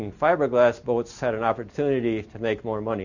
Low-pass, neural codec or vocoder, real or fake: 7.2 kHz; none; real